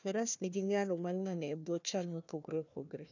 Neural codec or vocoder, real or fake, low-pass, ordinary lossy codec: codec, 16 kHz, 1 kbps, FunCodec, trained on Chinese and English, 50 frames a second; fake; 7.2 kHz; none